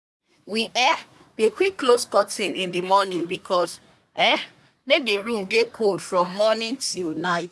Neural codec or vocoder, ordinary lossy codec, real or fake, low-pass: codec, 24 kHz, 1 kbps, SNAC; none; fake; none